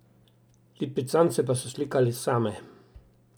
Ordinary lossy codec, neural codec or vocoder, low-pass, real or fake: none; none; none; real